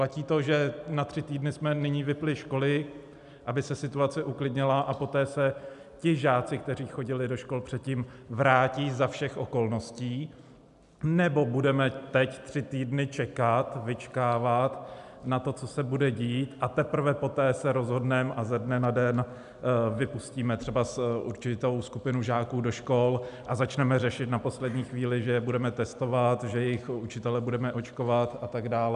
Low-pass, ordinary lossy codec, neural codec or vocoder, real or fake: 10.8 kHz; MP3, 96 kbps; none; real